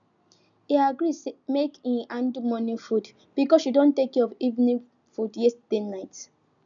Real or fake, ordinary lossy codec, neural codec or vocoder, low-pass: real; none; none; 7.2 kHz